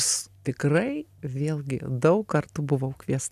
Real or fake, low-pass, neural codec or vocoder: real; 14.4 kHz; none